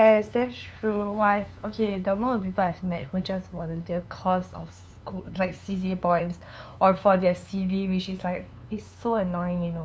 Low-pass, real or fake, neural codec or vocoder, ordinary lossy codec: none; fake; codec, 16 kHz, 2 kbps, FunCodec, trained on LibriTTS, 25 frames a second; none